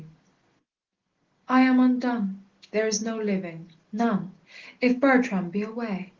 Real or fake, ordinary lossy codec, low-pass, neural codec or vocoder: real; Opus, 16 kbps; 7.2 kHz; none